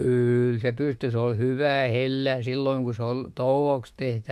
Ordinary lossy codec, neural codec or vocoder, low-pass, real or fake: MP3, 64 kbps; autoencoder, 48 kHz, 32 numbers a frame, DAC-VAE, trained on Japanese speech; 14.4 kHz; fake